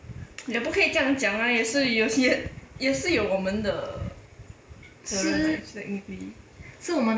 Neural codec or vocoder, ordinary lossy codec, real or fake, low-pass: none; none; real; none